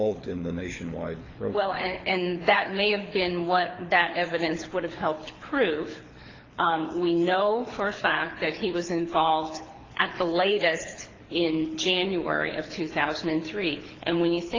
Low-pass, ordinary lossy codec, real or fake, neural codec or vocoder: 7.2 kHz; AAC, 32 kbps; fake; codec, 24 kHz, 6 kbps, HILCodec